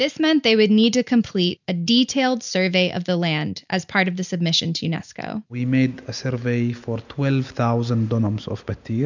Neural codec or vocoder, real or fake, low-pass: none; real; 7.2 kHz